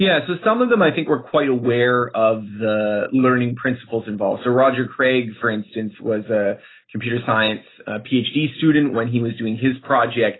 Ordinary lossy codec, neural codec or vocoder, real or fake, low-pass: AAC, 16 kbps; none; real; 7.2 kHz